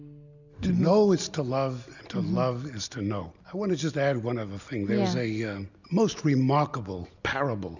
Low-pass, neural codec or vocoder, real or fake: 7.2 kHz; none; real